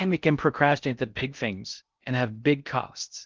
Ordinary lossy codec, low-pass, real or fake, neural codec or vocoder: Opus, 24 kbps; 7.2 kHz; fake; codec, 16 kHz in and 24 kHz out, 0.6 kbps, FocalCodec, streaming, 4096 codes